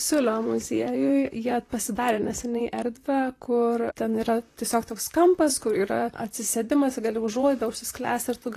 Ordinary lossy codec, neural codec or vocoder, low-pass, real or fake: AAC, 48 kbps; vocoder, 44.1 kHz, 128 mel bands, Pupu-Vocoder; 14.4 kHz; fake